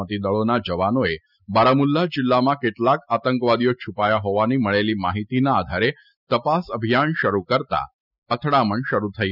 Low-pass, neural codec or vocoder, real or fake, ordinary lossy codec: 5.4 kHz; none; real; none